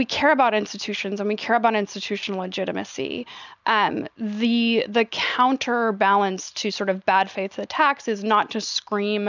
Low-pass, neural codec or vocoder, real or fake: 7.2 kHz; none; real